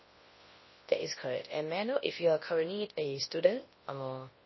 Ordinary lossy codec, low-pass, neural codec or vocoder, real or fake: MP3, 24 kbps; 7.2 kHz; codec, 24 kHz, 0.9 kbps, WavTokenizer, large speech release; fake